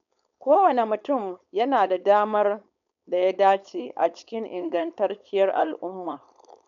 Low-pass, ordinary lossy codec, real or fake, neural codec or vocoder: 7.2 kHz; none; fake; codec, 16 kHz, 4.8 kbps, FACodec